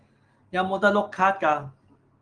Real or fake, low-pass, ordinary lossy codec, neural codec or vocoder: real; 9.9 kHz; Opus, 24 kbps; none